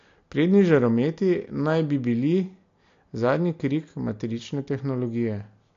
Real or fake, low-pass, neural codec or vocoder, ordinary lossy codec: real; 7.2 kHz; none; AAC, 48 kbps